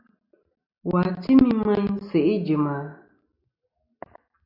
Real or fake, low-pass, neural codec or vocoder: real; 5.4 kHz; none